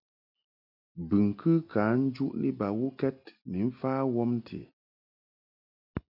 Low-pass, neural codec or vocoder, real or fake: 5.4 kHz; none; real